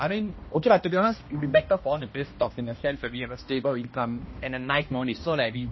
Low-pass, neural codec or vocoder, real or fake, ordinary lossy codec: 7.2 kHz; codec, 16 kHz, 1 kbps, X-Codec, HuBERT features, trained on balanced general audio; fake; MP3, 24 kbps